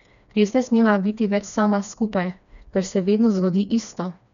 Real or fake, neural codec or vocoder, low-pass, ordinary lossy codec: fake; codec, 16 kHz, 2 kbps, FreqCodec, smaller model; 7.2 kHz; none